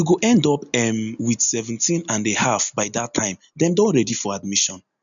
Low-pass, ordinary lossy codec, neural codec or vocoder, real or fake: 7.2 kHz; none; none; real